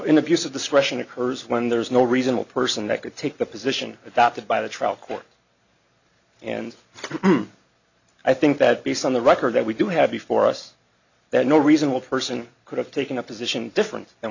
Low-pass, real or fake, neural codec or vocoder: 7.2 kHz; real; none